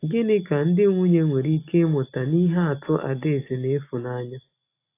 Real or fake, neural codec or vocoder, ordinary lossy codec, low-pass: real; none; AAC, 24 kbps; 3.6 kHz